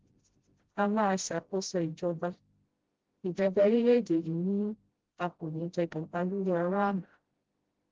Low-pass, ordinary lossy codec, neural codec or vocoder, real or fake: 7.2 kHz; Opus, 16 kbps; codec, 16 kHz, 0.5 kbps, FreqCodec, smaller model; fake